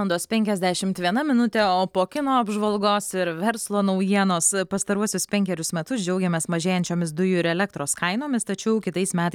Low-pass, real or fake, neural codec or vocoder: 19.8 kHz; real; none